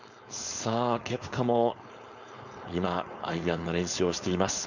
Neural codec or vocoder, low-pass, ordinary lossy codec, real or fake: codec, 16 kHz, 4.8 kbps, FACodec; 7.2 kHz; none; fake